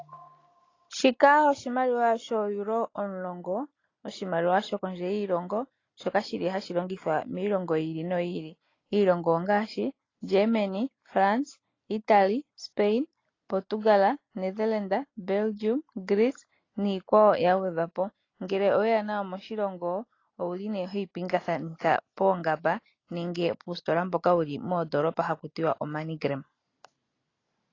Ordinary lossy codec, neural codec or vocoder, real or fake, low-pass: AAC, 32 kbps; none; real; 7.2 kHz